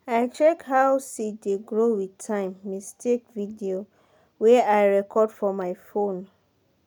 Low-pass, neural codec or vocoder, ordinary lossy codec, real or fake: none; none; none; real